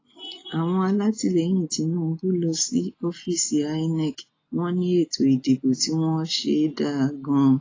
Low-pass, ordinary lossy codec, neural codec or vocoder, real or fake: 7.2 kHz; AAC, 32 kbps; none; real